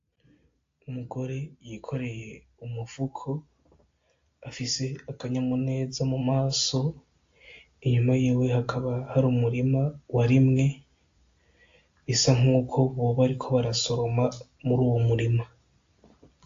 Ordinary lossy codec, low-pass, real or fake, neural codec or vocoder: AAC, 48 kbps; 7.2 kHz; real; none